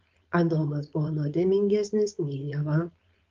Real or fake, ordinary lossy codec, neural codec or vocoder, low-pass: fake; Opus, 32 kbps; codec, 16 kHz, 4.8 kbps, FACodec; 7.2 kHz